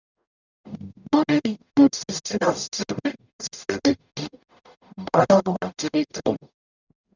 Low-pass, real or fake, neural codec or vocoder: 7.2 kHz; fake; codec, 44.1 kHz, 0.9 kbps, DAC